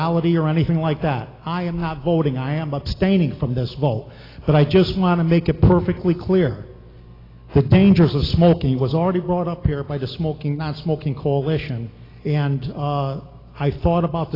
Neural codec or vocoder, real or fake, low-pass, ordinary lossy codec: none; real; 5.4 kHz; AAC, 24 kbps